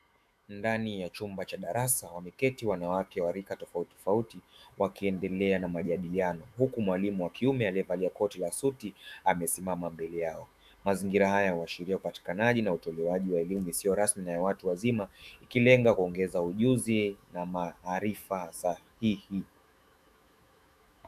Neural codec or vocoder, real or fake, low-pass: autoencoder, 48 kHz, 128 numbers a frame, DAC-VAE, trained on Japanese speech; fake; 14.4 kHz